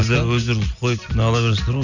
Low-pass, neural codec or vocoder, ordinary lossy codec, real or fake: 7.2 kHz; none; none; real